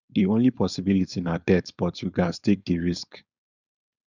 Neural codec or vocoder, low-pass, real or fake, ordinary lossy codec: codec, 16 kHz, 4.8 kbps, FACodec; 7.2 kHz; fake; none